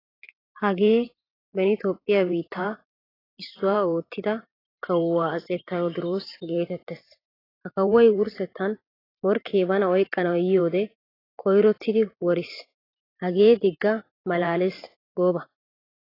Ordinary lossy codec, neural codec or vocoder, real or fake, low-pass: AAC, 24 kbps; vocoder, 44.1 kHz, 128 mel bands every 512 samples, BigVGAN v2; fake; 5.4 kHz